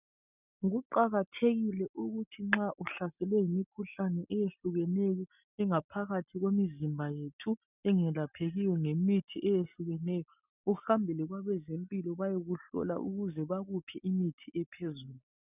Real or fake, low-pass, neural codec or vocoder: real; 3.6 kHz; none